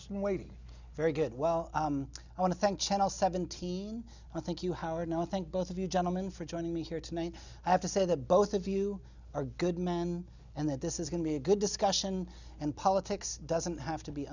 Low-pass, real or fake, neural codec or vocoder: 7.2 kHz; real; none